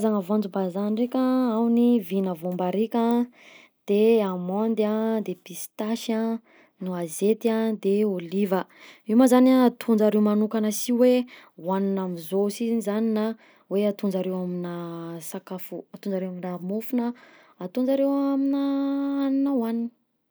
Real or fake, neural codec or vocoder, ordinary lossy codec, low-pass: real; none; none; none